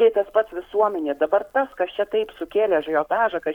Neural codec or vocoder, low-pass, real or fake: codec, 44.1 kHz, 7.8 kbps, Pupu-Codec; 19.8 kHz; fake